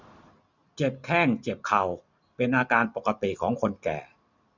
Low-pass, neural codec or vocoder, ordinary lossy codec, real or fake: 7.2 kHz; none; none; real